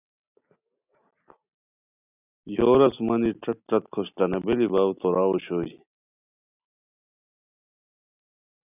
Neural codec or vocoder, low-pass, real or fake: none; 3.6 kHz; real